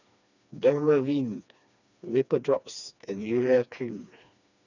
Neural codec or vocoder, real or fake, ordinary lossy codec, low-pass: codec, 16 kHz, 2 kbps, FreqCodec, smaller model; fake; none; 7.2 kHz